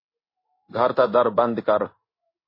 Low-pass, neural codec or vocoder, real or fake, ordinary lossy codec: 5.4 kHz; codec, 16 kHz in and 24 kHz out, 1 kbps, XY-Tokenizer; fake; MP3, 24 kbps